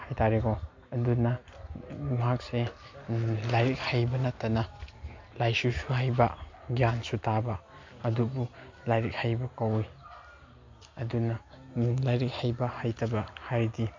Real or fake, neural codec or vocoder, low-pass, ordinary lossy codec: real; none; 7.2 kHz; MP3, 48 kbps